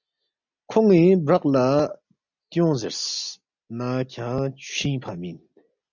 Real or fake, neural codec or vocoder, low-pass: real; none; 7.2 kHz